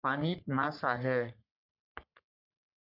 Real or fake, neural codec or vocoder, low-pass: fake; codec, 44.1 kHz, 3.4 kbps, Pupu-Codec; 5.4 kHz